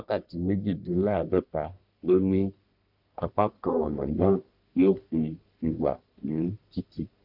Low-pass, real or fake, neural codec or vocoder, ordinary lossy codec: 5.4 kHz; fake; codec, 44.1 kHz, 1.7 kbps, Pupu-Codec; none